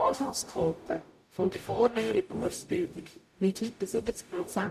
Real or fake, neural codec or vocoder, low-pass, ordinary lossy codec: fake; codec, 44.1 kHz, 0.9 kbps, DAC; 14.4 kHz; none